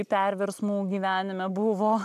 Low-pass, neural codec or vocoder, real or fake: 14.4 kHz; none; real